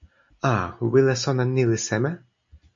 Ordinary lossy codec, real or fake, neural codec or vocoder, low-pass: MP3, 48 kbps; real; none; 7.2 kHz